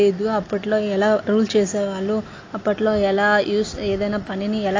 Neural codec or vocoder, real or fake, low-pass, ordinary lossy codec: none; real; 7.2 kHz; AAC, 32 kbps